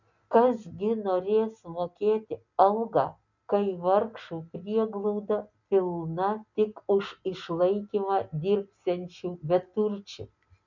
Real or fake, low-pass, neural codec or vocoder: real; 7.2 kHz; none